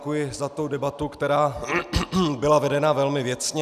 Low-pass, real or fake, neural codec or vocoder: 14.4 kHz; real; none